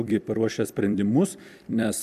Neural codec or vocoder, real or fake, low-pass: vocoder, 44.1 kHz, 128 mel bands every 256 samples, BigVGAN v2; fake; 14.4 kHz